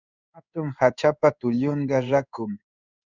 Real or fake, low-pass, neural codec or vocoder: fake; 7.2 kHz; codec, 16 kHz in and 24 kHz out, 1 kbps, XY-Tokenizer